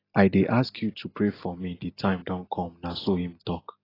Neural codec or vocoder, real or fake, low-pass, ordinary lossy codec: none; real; 5.4 kHz; AAC, 24 kbps